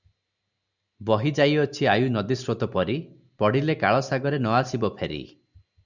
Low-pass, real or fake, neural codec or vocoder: 7.2 kHz; real; none